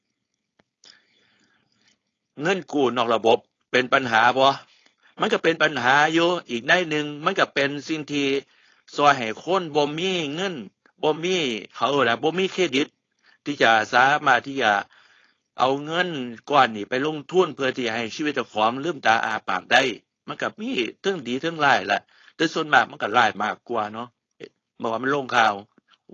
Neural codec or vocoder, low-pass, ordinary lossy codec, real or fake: codec, 16 kHz, 4.8 kbps, FACodec; 7.2 kHz; AAC, 32 kbps; fake